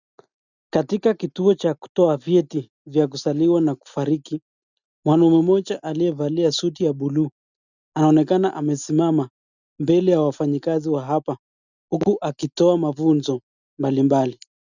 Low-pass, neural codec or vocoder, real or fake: 7.2 kHz; none; real